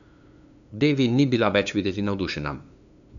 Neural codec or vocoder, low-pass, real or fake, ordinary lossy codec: codec, 16 kHz, 2 kbps, FunCodec, trained on LibriTTS, 25 frames a second; 7.2 kHz; fake; none